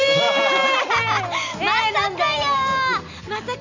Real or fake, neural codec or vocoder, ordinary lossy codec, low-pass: real; none; none; 7.2 kHz